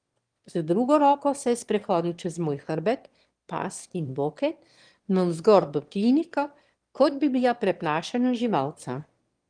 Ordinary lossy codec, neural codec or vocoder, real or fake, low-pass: Opus, 24 kbps; autoencoder, 22.05 kHz, a latent of 192 numbers a frame, VITS, trained on one speaker; fake; 9.9 kHz